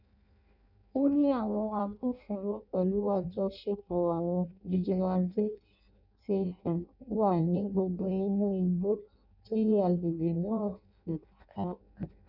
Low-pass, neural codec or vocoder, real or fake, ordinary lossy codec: 5.4 kHz; codec, 16 kHz in and 24 kHz out, 0.6 kbps, FireRedTTS-2 codec; fake; none